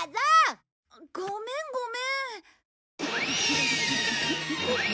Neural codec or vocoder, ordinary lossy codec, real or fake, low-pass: none; none; real; none